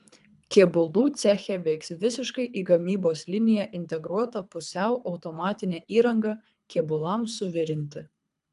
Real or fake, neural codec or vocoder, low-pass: fake; codec, 24 kHz, 3 kbps, HILCodec; 10.8 kHz